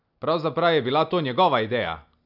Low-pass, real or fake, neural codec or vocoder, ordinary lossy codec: 5.4 kHz; real; none; none